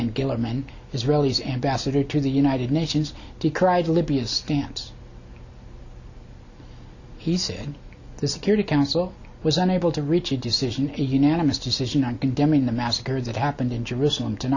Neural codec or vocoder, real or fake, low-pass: none; real; 7.2 kHz